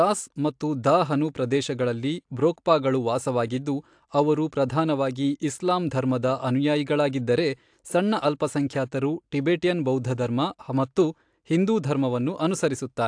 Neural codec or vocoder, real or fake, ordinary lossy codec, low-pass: none; real; AAC, 64 kbps; 9.9 kHz